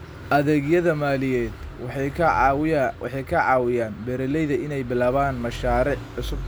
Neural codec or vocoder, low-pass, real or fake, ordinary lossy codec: none; none; real; none